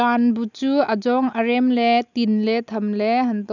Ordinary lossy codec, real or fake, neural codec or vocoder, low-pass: none; real; none; 7.2 kHz